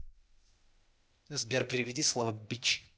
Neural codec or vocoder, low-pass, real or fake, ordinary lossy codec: codec, 16 kHz, 0.8 kbps, ZipCodec; none; fake; none